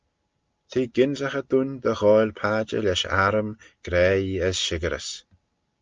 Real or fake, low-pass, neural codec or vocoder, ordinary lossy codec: real; 7.2 kHz; none; Opus, 24 kbps